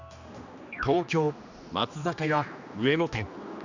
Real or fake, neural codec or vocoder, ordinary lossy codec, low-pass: fake; codec, 16 kHz, 2 kbps, X-Codec, HuBERT features, trained on general audio; none; 7.2 kHz